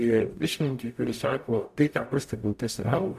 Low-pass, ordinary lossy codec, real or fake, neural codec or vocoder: 14.4 kHz; AAC, 96 kbps; fake; codec, 44.1 kHz, 0.9 kbps, DAC